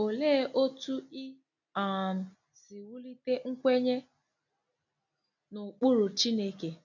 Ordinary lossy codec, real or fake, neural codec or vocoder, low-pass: none; real; none; 7.2 kHz